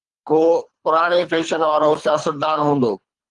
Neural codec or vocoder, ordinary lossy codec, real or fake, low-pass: codec, 24 kHz, 3 kbps, HILCodec; Opus, 32 kbps; fake; 10.8 kHz